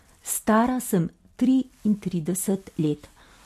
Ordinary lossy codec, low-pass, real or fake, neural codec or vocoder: MP3, 64 kbps; 14.4 kHz; real; none